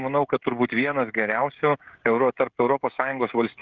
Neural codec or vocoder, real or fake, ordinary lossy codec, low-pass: codec, 16 kHz, 16 kbps, FreqCodec, smaller model; fake; Opus, 24 kbps; 7.2 kHz